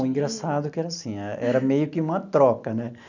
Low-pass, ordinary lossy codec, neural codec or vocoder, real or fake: 7.2 kHz; none; none; real